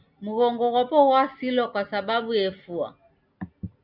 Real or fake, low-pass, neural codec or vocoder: real; 5.4 kHz; none